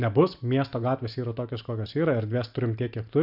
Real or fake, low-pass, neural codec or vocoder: real; 5.4 kHz; none